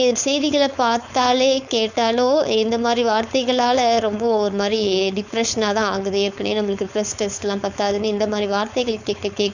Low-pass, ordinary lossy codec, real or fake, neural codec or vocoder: 7.2 kHz; none; fake; codec, 16 kHz, 4.8 kbps, FACodec